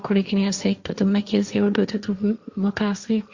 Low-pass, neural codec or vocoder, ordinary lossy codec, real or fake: 7.2 kHz; codec, 16 kHz, 1.1 kbps, Voila-Tokenizer; Opus, 64 kbps; fake